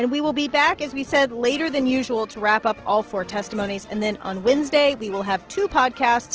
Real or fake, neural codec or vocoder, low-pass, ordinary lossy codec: real; none; 7.2 kHz; Opus, 16 kbps